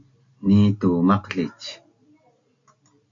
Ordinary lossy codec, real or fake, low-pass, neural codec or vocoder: AAC, 48 kbps; real; 7.2 kHz; none